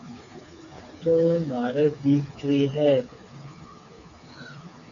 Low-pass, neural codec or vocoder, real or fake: 7.2 kHz; codec, 16 kHz, 4 kbps, FreqCodec, smaller model; fake